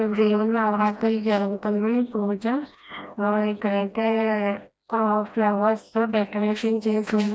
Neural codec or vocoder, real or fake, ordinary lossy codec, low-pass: codec, 16 kHz, 1 kbps, FreqCodec, smaller model; fake; none; none